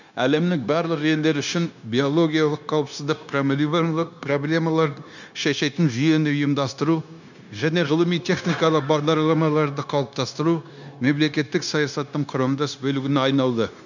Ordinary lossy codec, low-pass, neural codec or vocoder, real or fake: none; 7.2 kHz; codec, 16 kHz, 0.9 kbps, LongCat-Audio-Codec; fake